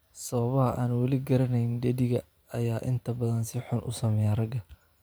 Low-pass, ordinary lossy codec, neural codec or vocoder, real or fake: none; none; vocoder, 44.1 kHz, 128 mel bands every 512 samples, BigVGAN v2; fake